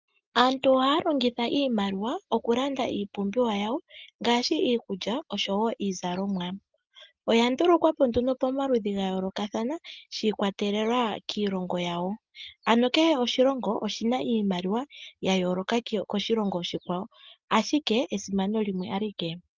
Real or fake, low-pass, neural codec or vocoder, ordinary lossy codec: real; 7.2 kHz; none; Opus, 32 kbps